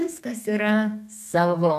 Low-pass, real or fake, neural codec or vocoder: 14.4 kHz; fake; codec, 32 kHz, 1.9 kbps, SNAC